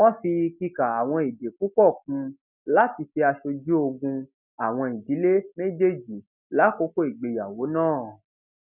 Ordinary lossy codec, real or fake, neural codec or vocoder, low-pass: none; real; none; 3.6 kHz